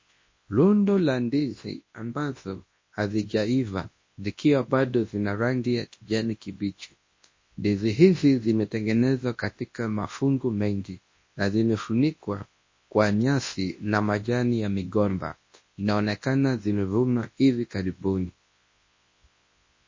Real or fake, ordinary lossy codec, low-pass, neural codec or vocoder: fake; MP3, 32 kbps; 7.2 kHz; codec, 24 kHz, 0.9 kbps, WavTokenizer, large speech release